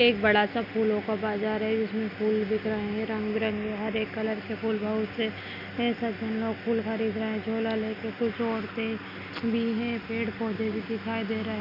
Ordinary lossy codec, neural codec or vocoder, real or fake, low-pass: none; none; real; 5.4 kHz